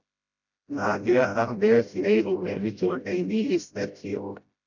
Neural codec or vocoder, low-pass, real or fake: codec, 16 kHz, 0.5 kbps, FreqCodec, smaller model; 7.2 kHz; fake